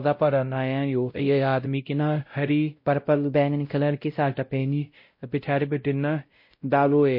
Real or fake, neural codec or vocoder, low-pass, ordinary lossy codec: fake; codec, 16 kHz, 0.5 kbps, X-Codec, WavLM features, trained on Multilingual LibriSpeech; 5.4 kHz; MP3, 32 kbps